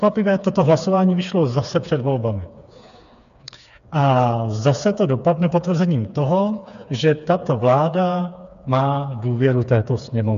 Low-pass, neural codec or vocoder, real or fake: 7.2 kHz; codec, 16 kHz, 4 kbps, FreqCodec, smaller model; fake